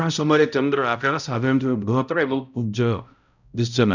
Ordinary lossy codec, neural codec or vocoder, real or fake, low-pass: none; codec, 16 kHz, 0.5 kbps, X-Codec, HuBERT features, trained on balanced general audio; fake; 7.2 kHz